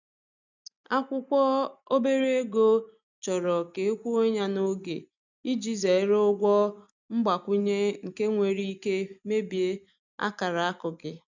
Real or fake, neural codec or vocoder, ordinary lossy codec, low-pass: real; none; none; 7.2 kHz